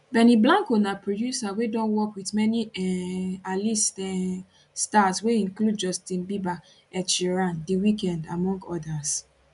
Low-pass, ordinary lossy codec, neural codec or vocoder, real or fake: 10.8 kHz; none; none; real